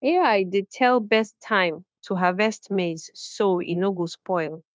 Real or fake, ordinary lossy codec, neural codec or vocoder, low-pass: fake; none; codec, 16 kHz, 0.9 kbps, LongCat-Audio-Codec; none